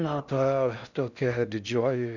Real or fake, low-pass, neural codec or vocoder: fake; 7.2 kHz; codec, 16 kHz in and 24 kHz out, 0.6 kbps, FocalCodec, streaming, 4096 codes